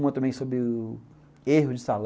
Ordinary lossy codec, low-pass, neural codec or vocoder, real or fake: none; none; none; real